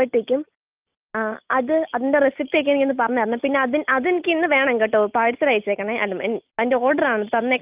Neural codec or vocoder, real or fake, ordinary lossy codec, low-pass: none; real; Opus, 24 kbps; 3.6 kHz